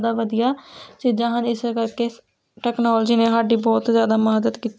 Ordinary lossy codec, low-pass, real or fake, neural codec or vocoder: none; none; real; none